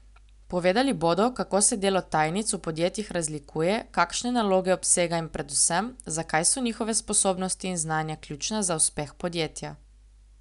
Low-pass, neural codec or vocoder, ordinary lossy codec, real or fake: 10.8 kHz; none; none; real